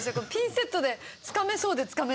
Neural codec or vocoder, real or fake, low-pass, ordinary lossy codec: none; real; none; none